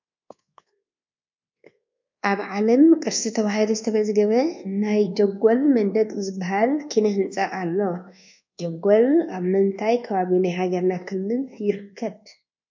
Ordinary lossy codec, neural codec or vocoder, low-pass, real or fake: MP3, 64 kbps; codec, 24 kHz, 1.2 kbps, DualCodec; 7.2 kHz; fake